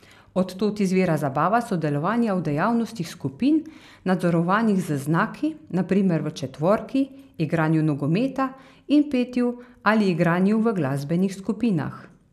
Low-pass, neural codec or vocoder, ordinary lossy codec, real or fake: 14.4 kHz; none; none; real